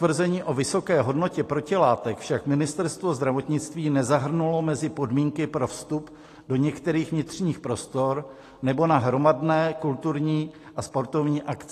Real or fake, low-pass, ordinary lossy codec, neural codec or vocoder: real; 14.4 kHz; AAC, 48 kbps; none